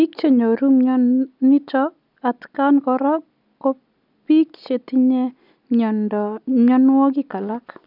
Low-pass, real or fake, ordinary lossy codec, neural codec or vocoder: 5.4 kHz; real; none; none